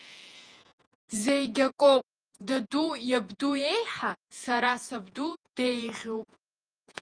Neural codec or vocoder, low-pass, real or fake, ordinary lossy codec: vocoder, 48 kHz, 128 mel bands, Vocos; 9.9 kHz; fake; Opus, 32 kbps